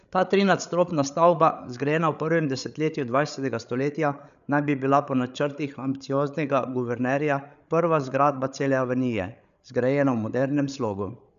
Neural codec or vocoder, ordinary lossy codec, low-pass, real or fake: codec, 16 kHz, 8 kbps, FreqCodec, larger model; none; 7.2 kHz; fake